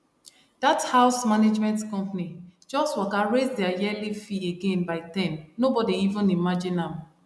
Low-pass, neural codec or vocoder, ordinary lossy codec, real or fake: none; none; none; real